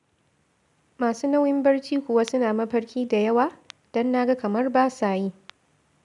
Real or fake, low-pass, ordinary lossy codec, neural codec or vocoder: real; 10.8 kHz; none; none